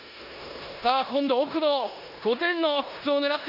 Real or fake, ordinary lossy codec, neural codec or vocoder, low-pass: fake; none; codec, 16 kHz in and 24 kHz out, 0.9 kbps, LongCat-Audio-Codec, four codebook decoder; 5.4 kHz